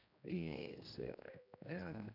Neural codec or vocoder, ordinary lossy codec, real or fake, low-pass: codec, 16 kHz, 1 kbps, X-Codec, HuBERT features, trained on general audio; none; fake; 5.4 kHz